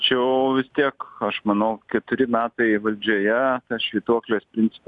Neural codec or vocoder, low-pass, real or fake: none; 10.8 kHz; real